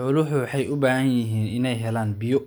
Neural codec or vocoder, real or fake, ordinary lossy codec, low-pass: none; real; none; none